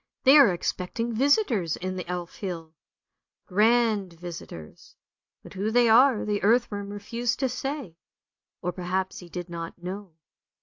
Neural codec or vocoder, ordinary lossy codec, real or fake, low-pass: none; AAC, 48 kbps; real; 7.2 kHz